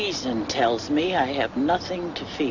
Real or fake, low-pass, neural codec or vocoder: real; 7.2 kHz; none